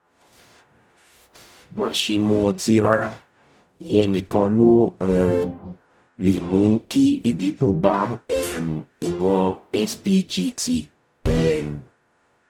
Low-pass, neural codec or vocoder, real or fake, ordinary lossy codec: 19.8 kHz; codec, 44.1 kHz, 0.9 kbps, DAC; fake; none